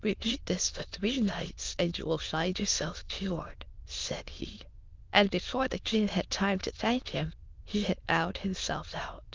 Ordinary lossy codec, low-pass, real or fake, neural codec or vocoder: Opus, 24 kbps; 7.2 kHz; fake; autoencoder, 22.05 kHz, a latent of 192 numbers a frame, VITS, trained on many speakers